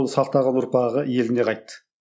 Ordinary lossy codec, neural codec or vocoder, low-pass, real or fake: none; none; none; real